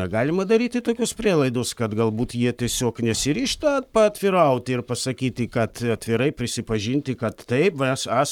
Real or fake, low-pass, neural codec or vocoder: fake; 19.8 kHz; codec, 44.1 kHz, 7.8 kbps, Pupu-Codec